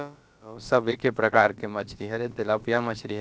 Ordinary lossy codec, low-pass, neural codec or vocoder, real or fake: none; none; codec, 16 kHz, about 1 kbps, DyCAST, with the encoder's durations; fake